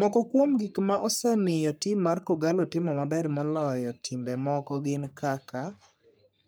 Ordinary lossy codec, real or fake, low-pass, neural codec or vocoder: none; fake; none; codec, 44.1 kHz, 3.4 kbps, Pupu-Codec